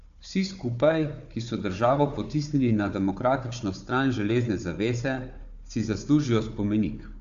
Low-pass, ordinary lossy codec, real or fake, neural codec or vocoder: 7.2 kHz; AAC, 64 kbps; fake; codec, 16 kHz, 4 kbps, FunCodec, trained on Chinese and English, 50 frames a second